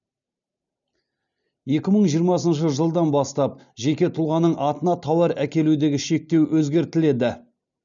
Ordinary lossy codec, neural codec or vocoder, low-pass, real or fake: none; none; 7.2 kHz; real